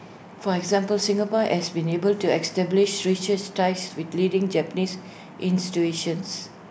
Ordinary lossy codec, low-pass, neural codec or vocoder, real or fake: none; none; none; real